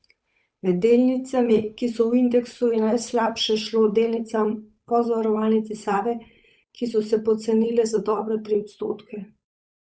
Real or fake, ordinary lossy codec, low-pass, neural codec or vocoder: fake; none; none; codec, 16 kHz, 8 kbps, FunCodec, trained on Chinese and English, 25 frames a second